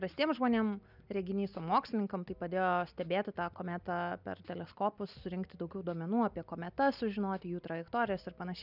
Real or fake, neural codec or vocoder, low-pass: real; none; 5.4 kHz